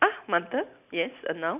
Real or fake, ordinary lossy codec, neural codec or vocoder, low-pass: real; none; none; 3.6 kHz